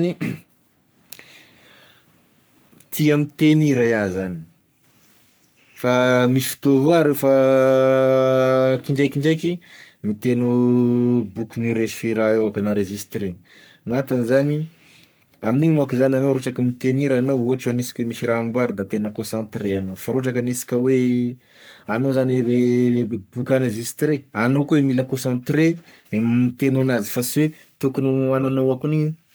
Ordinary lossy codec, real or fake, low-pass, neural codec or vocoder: none; fake; none; codec, 44.1 kHz, 3.4 kbps, Pupu-Codec